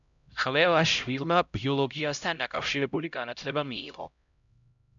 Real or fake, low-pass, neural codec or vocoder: fake; 7.2 kHz; codec, 16 kHz, 0.5 kbps, X-Codec, HuBERT features, trained on LibriSpeech